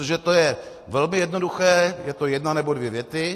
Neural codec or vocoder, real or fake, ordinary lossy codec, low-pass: none; real; AAC, 48 kbps; 14.4 kHz